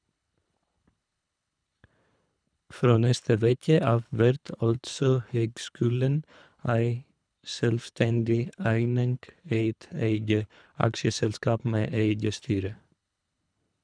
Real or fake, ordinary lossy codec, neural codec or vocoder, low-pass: fake; none; codec, 24 kHz, 3 kbps, HILCodec; 9.9 kHz